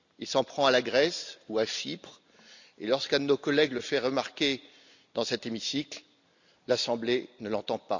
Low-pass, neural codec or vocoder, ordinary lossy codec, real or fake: 7.2 kHz; none; none; real